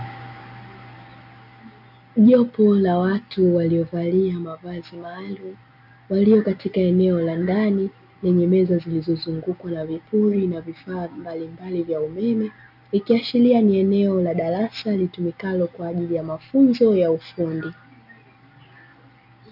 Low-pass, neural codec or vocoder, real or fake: 5.4 kHz; none; real